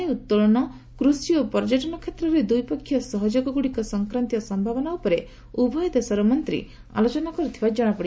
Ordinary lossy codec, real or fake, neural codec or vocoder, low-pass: none; real; none; none